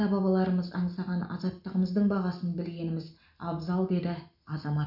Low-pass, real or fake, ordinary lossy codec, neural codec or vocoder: 5.4 kHz; real; none; none